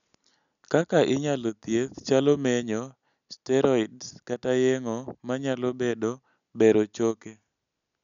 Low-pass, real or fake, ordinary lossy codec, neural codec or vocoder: 7.2 kHz; real; none; none